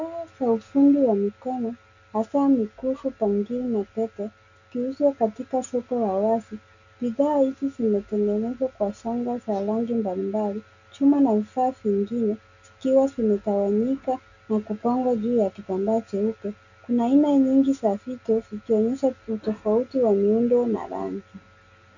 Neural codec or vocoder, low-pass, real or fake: none; 7.2 kHz; real